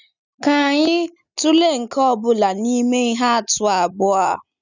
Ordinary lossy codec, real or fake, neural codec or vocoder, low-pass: none; real; none; 7.2 kHz